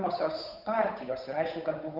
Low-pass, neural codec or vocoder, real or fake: 5.4 kHz; codec, 16 kHz, 8 kbps, FunCodec, trained on Chinese and English, 25 frames a second; fake